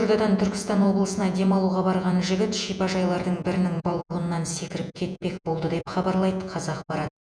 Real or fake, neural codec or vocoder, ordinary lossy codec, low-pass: fake; vocoder, 48 kHz, 128 mel bands, Vocos; none; 9.9 kHz